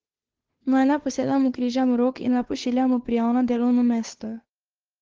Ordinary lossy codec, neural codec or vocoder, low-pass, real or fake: Opus, 16 kbps; codec, 16 kHz, 2 kbps, FunCodec, trained on Chinese and English, 25 frames a second; 7.2 kHz; fake